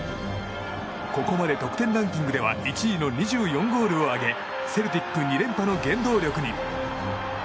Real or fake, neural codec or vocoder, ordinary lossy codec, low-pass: real; none; none; none